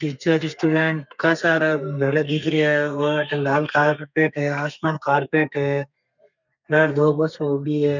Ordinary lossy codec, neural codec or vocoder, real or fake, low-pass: none; codec, 32 kHz, 1.9 kbps, SNAC; fake; 7.2 kHz